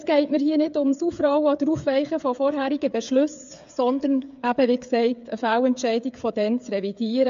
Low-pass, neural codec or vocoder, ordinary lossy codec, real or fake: 7.2 kHz; codec, 16 kHz, 8 kbps, FreqCodec, smaller model; AAC, 64 kbps; fake